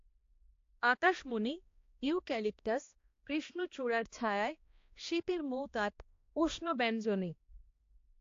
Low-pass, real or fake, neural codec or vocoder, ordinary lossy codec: 7.2 kHz; fake; codec, 16 kHz, 1 kbps, X-Codec, HuBERT features, trained on balanced general audio; AAC, 48 kbps